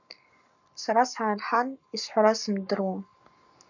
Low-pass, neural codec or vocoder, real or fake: 7.2 kHz; codec, 44.1 kHz, 7.8 kbps, Pupu-Codec; fake